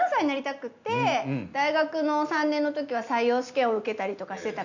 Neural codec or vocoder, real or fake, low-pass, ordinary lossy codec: none; real; 7.2 kHz; none